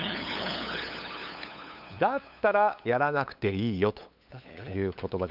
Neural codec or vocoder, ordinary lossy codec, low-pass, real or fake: codec, 16 kHz, 8 kbps, FunCodec, trained on LibriTTS, 25 frames a second; none; 5.4 kHz; fake